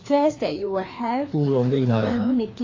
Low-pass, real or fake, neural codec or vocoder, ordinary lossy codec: 7.2 kHz; fake; codec, 16 kHz, 2 kbps, FreqCodec, larger model; AAC, 32 kbps